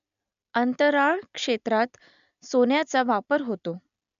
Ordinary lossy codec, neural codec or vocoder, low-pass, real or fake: none; none; 7.2 kHz; real